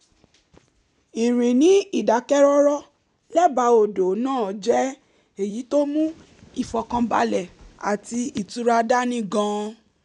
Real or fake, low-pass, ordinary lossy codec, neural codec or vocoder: real; 10.8 kHz; none; none